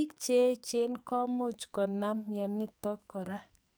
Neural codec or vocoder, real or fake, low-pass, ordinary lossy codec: codec, 44.1 kHz, 2.6 kbps, SNAC; fake; none; none